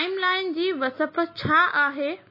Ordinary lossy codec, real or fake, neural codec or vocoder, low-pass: MP3, 24 kbps; real; none; 5.4 kHz